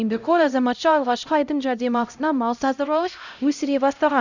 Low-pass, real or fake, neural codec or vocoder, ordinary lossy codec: 7.2 kHz; fake; codec, 16 kHz, 0.5 kbps, X-Codec, HuBERT features, trained on LibriSpeech; none